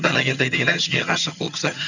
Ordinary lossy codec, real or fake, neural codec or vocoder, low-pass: none; fake; vocoder, 22.05 kHz, 80 mel bands, HiFi-GAN; 7.2 kHz